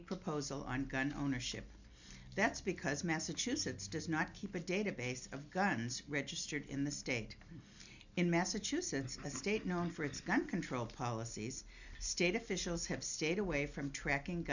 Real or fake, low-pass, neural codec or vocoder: real; 7.2 kHz; none